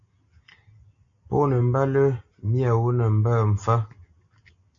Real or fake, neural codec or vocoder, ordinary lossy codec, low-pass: real; none; AAC, 48 kbps; 7.2 kHz